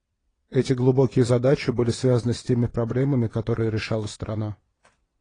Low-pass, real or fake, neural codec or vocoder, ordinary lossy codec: 9.9 kHz; fake; vocoder, 22.05 kHz, 80 mel bands, WaveNeXt; AAC, 32 kbps